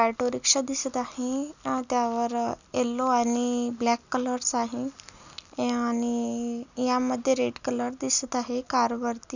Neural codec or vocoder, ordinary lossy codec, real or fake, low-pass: none; none; real; 7.2 kHz